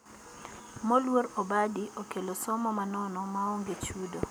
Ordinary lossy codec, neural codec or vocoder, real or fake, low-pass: none; none; real; none